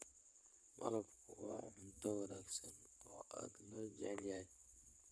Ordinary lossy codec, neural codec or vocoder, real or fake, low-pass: none; vocoder, 22.05 kHz, 80 mel bands, WaveNeXt; fake; none